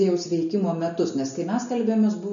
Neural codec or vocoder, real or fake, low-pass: none; real; 7.2 kHz